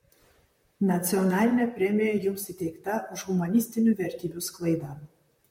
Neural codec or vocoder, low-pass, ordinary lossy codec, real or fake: vocoder, 44.1 kHz, 128 mel bands, Pupu-Vocoder; 19.8 kHz; MP3, 64 kbps; fake